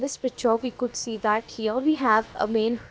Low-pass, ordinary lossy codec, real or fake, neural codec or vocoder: none; none; fake; codec, 16 kHz, about 1 kbps, DyCAST, with the encoder's durations